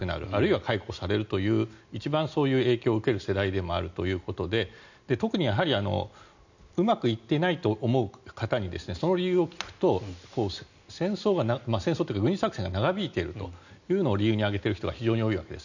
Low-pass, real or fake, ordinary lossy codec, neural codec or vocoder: 7.2 kHz; real; none; none